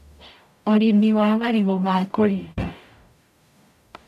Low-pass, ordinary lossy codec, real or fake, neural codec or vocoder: 14.4 kHz; none; fake; codec, 44.1 kHz, 0.9 kbps, DAC